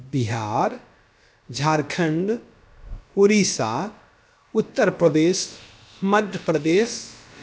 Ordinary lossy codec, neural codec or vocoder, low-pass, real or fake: none; codec, 16 kHz, about 1 kbps, DyCAST, with the encoder's durations; none; fake